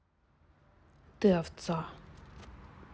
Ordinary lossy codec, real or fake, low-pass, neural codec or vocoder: none; real; none; none